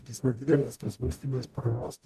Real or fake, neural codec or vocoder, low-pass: fake; codec, 44.1 kHz, 0.9 kbps, DAC; 14.4 kHz